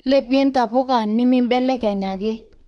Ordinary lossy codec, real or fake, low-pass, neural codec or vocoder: none; fake; 10.8 kHz; codec, 24 kHz, 1 kbps, SNAC